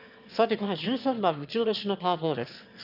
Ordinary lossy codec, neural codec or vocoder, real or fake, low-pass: none; autoencoder, 22.05 kHz, a latent of 192 numbers a frame, VITS, trained on one speaker; fake; 5.4 kHz